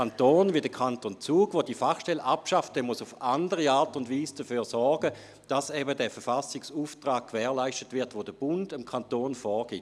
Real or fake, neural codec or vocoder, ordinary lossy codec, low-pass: real; none; none; none